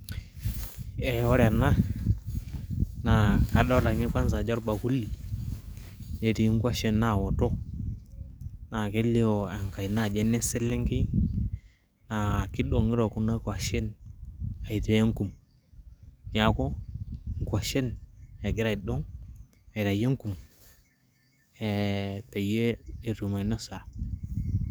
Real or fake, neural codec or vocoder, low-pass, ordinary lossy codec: fake; codec, 44.1 kHz, 7.8 kbps, DAC; none; none